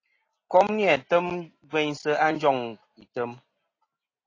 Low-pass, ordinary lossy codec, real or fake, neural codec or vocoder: 7.2 kHz; AAC, 32 kbps; real; none